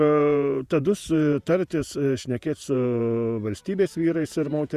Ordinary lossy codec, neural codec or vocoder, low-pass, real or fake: Opus, 64 kbps; vocoder, 48 kHz, 128 mel bands, Vocos; 14.4 kHz; fake